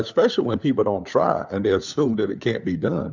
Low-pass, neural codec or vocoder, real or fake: 7.2 kHz; codec, 16 kHz, 4 kbps, FunCodec, trained on LibriTTS, 50 frames a second; fake